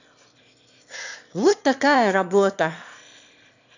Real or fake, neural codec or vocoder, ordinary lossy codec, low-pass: fake; autoencoder, 22.05 kHz, a latent of 192 numbers a frame, VITS, trained on one speaker; none; 7.2 kHz